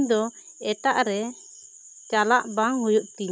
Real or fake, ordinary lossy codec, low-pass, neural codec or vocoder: real; none; none; none